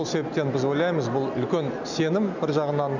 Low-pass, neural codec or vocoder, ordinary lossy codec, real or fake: 7.2 kHz; none; none; real